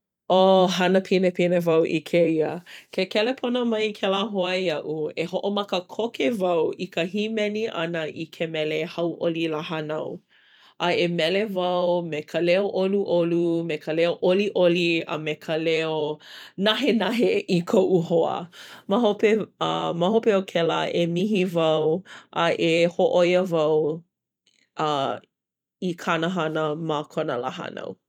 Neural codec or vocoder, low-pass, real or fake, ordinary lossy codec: vocoder, 44.1 kHz, 128 mel bands every 512 samples, BigVGAN v2; 19.8 kHz; fake; none